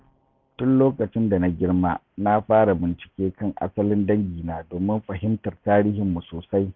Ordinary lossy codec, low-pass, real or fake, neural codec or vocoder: none; 7.2 kHz; real; none